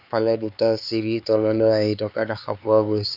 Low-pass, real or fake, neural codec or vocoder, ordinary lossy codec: 5.4 kHz; fake; codec, 16 kHz, 4 kbps, X-Codec, HuBERT features, trained on LibriSpeech; none